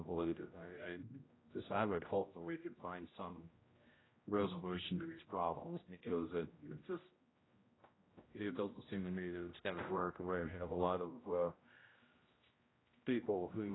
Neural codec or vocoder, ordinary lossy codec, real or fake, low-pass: codec, 16 kHz, 0.5 kbps, X-Codec, HuBERT features, trained on general audio; AAC, 16 kbps; fake; 7.2 kHz